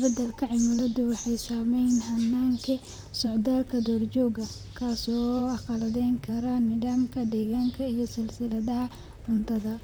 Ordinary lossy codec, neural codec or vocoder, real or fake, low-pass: none; vocoder, 44.1 kHz, 128 mel bands, Pupu-Vocoder; fake; none